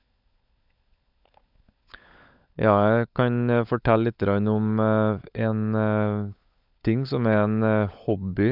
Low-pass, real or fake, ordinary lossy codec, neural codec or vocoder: 5.4 kHz; fake; none; codec, 16 kHz, 16 kbps, FunCodec, trained on LibriTTS, 50 frames a second